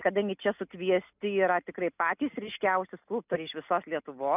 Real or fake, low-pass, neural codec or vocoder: real; 3.6 kHz; none